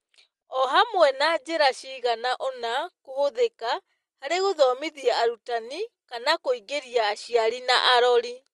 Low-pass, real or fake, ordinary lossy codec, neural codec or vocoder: 10.8 kHz; real; Opus, 24 kbps; none